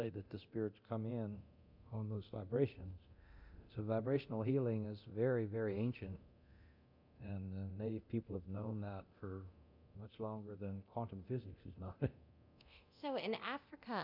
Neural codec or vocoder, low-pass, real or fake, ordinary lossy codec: codec, 24 kHz, 0.9 kbps, DualCodec; 5.4 kHz; fake; MP3, 48 kbps